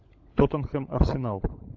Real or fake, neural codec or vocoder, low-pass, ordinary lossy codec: fake; codec, 16 kHz, 16 kbps, FunCodec, trained on LibriTTS, 50 frames a second; 7.2 kHz; AAC, 48 kbps